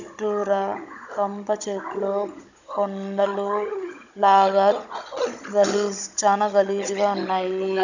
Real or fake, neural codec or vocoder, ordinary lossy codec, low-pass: fake; codec, 16 kHz, 16 kbps, FunCodec, trained on Chinese and English, 50 frames a second; none; 7.2 kHz